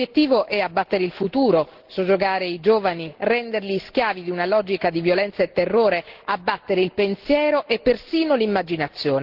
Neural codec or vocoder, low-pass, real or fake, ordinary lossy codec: none; 5.4 kHz; real; Opus, 16 kbps